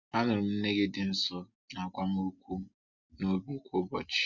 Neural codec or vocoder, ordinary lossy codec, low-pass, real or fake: none; Opus, 64 kbps; 7.2 kHz; real